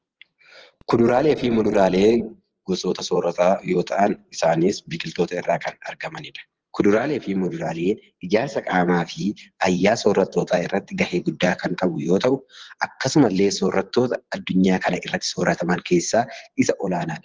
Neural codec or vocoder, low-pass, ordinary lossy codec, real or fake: vocoder, 24 kHz, 100 mel bands, Vocos; 7.2 kHz; Opus, 16 kbps; fake